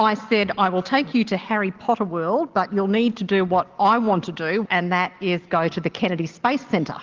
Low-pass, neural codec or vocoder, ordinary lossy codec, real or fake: 7.2 kHz; none; Opus, 16 kbps; real